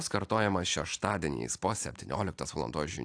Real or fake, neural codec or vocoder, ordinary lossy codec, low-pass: real; none; AAC, 64 kbps; 9.9 kHz